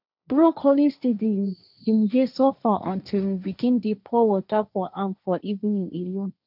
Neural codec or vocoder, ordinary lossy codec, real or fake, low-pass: codec, 16 kHz, 1.1 kbps, Voila-Tokenizer; none; fake; 5.4 kHz